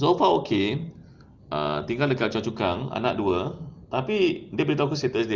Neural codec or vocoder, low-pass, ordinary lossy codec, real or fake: none; 7.2 kHz; Opus, 32 kbps; real